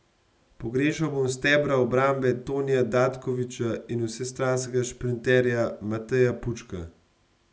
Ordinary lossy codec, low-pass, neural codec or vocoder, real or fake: none; none; none; real